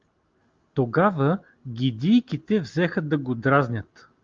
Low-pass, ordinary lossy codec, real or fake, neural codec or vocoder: 7.2 kHz; Opus, 32 kbps; real; none